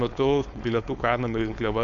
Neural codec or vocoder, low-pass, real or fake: codec, 16 kHz, 4.8 kbps, FACodec; 7.2 kHz; fake